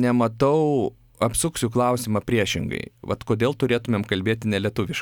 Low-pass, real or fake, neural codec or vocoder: 19.8 kHz; real; none